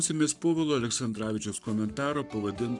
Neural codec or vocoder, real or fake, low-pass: codec, 44.1 kHz, 7.8 kbps, Pupu-Codec; fake; 10.8 kHz